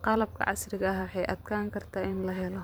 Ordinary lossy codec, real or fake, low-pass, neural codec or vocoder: none; fake; none; vocoder, 44.1 kHz, 128 mel bands every 512 samples, BigVGAN v2